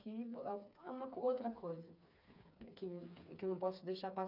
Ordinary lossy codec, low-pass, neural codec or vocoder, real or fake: none; 5.4 kHz; codec, 16 kHz, 4 kbps, FreqCodec, smaller model; fake